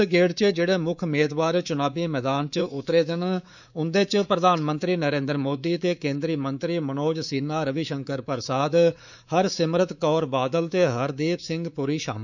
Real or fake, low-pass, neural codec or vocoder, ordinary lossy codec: fake; 7.2 kHz; codec, 24 kHz, 3.1 kbps, DualCodec; none